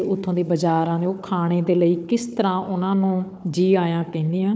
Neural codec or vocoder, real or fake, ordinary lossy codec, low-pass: codec, 16 kHz, 4 kbps, FunCodec, trained on Chinese and English, 50 frames a second; fake; none; none